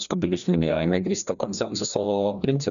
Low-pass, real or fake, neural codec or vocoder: 7.2 kHz; fake; codec, 16 kHz, 1 kbps, FreqCodec, larger model